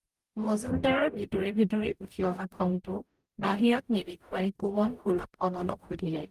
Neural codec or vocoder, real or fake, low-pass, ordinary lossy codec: codec, 44.1 kHz, 0.9 kbps, DAC; fake; 14.4 kHz; Opus, 32 kbps